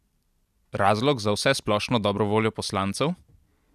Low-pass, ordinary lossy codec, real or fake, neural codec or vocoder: 14.4 kHz; none; real; none